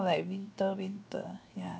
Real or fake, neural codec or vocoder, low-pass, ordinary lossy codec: fake; codec, 16 kHz, 0.7 kbps, FocalCodec; none; none